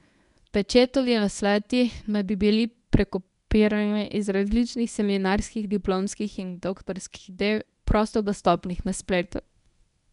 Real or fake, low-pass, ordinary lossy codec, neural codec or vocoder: fake; 10.8 kHz; none; codec, 24 kHz, 0.9 kbps, WavTokenizer, medium speech release version 1